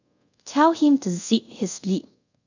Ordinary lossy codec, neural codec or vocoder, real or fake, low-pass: none; codec, 24 kHz, 0.5 kbps, DualCodec; fake; 7.2 kHz